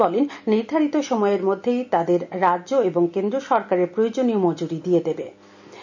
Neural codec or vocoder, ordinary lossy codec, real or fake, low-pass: none; none; real; 7.2 kHz